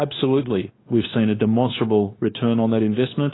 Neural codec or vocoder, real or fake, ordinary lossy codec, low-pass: codec, 16 kHz, 0.9 kbps, LongCat-Audio-Codec; fake; AAC, 16 kbps; 7.2 kHz